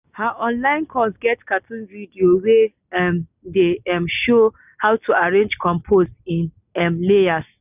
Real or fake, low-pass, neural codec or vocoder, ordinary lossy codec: real; 3.6 kHz; none; none